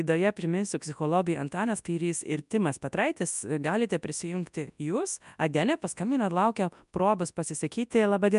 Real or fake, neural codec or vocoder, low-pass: fake; codec, 24 kHz, 0.9 kbps, WavTokenizer, large speech release; 10.8 kHz